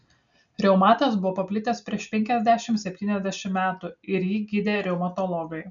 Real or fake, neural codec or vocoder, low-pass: real; none; 7.2 kHz